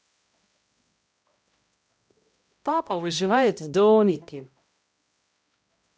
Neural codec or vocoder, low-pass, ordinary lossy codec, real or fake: codec, 16 kHz, 0.5 kbps, X-Codec, HuBERT features, trained on balanced general audio; none; none; fake